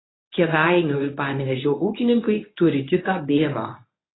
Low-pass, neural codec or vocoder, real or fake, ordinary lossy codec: 7.2 kHz; codec, 24 kHz, 0.9 kbps, WavTokenizer, medium speech release version 1; fake; AAC, 16 kbps